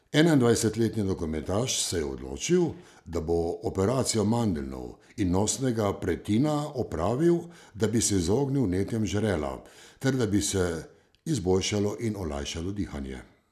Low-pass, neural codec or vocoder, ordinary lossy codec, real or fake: 14.4 kHz; none; none; real